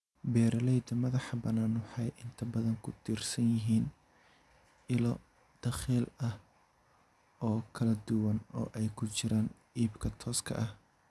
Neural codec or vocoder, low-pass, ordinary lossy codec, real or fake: none; none; none; real